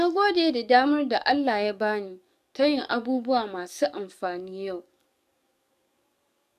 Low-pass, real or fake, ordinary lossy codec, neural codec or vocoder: 14.4 kHz; fake; MP3, 96 kbps; codec, 44.1 kHz, 7.8 kbps, DAC